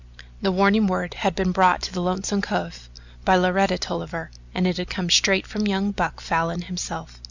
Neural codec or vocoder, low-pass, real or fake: none; 7.2 kHz; real